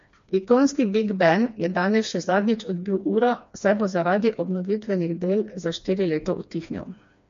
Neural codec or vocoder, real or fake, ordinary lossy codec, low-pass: codec, 16 kHz, 2 kbps, FreqCodec, smaller model; fake; MP3, 48 kbps; 7.2 kHz